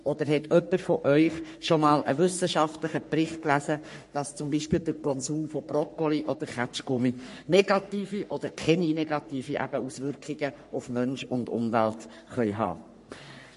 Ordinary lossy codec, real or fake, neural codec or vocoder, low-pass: MP3, 48 kbps; fake; codec, 44.1 kHz, 3.4 kbps, Pupu-Codec; 14.4 kHz